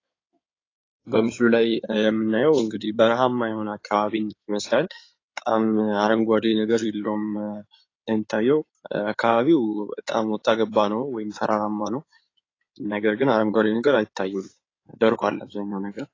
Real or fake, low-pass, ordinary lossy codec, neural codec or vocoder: fake; 7.2 kHz; AAC, 32 kbps; codec, 16 kHz in and 24 kHz out, 2.2 kbps, FireRedTTS-2 codec